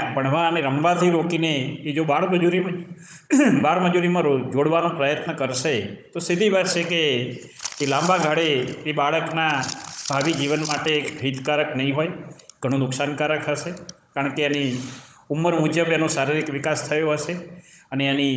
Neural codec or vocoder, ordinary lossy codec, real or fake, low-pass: codec, 16 kHz, 16 kbps, FunCodec, trained on Chinese and English, 50 frames a second; none; fake; none